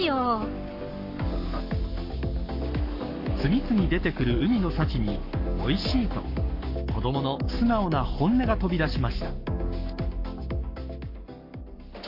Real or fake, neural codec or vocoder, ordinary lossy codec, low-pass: fake; codec, 44.1 kHz, 7.8 kbps, Pupu-Codec; MP3, 32 kbps; 5.4 kHz